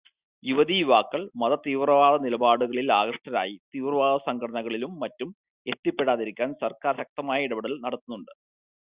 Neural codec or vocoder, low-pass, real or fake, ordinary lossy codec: none; 3.6 kHz; real; Opus, 64 kbps